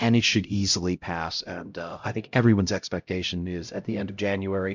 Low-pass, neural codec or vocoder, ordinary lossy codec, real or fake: 7.2 kHz; codec, 16 kHz, 0.5 kbps, X-Codec, HuBERT features, trained on LibriSpeech; MP3, 64 kbps; fake